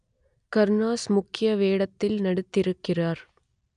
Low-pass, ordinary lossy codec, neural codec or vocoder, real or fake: 9.9 kHz; none; none; real